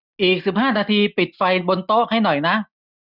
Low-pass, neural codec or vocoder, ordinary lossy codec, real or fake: 5.4 kHz; none; none; real